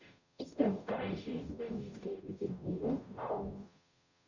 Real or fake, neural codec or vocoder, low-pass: fake; codec, 44.1 kHz, 0.9 kbps, DAC; 7.2 kHz